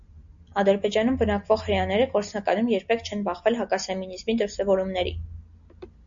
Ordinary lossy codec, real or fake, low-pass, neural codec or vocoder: MP3, 64 kbps; real; 7.2 kHz; none